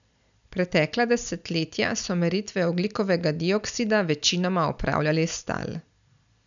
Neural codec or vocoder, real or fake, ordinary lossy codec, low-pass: none; real; none; 7.2 kHz